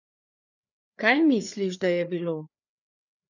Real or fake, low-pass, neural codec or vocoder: fake; 7.2 kHz; codec, 16 kHz, 4 kbps, FreqCodec, larger model